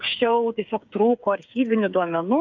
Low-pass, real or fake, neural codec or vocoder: 7.2 kHz; fake; codec, 16 kHz, 8 kbps, FreqCodec, smaller model